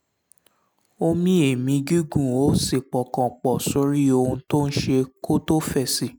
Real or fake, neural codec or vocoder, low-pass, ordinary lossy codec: real; none; none; none